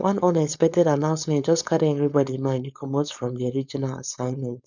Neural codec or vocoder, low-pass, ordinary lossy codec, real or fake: codec, 16 kHz, 4.8 kbps, FACodec; 7.2 kHz; none; fake